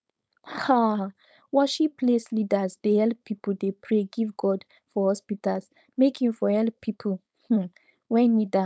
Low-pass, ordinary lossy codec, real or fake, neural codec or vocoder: none; none; fake; codec, 16 kHz, 4.8 kbps, FACodec